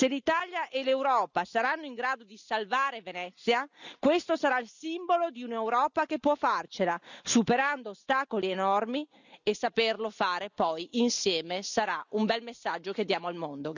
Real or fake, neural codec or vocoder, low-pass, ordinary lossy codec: real; none; 7.2 kHz; none